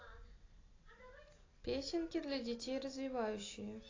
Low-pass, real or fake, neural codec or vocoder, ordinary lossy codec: 7.2 kHz; real; none; AAC, 32 kbps